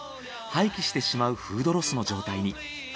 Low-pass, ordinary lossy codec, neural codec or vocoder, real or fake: none; none; none; real